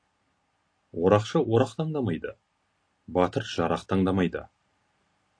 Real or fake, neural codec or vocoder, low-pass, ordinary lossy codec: real; none; 9.9 kHz; AAC, 48 kbps